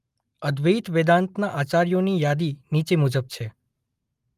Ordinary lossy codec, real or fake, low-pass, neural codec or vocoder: Opus, 32 kbps; real; 14.4 kHz; none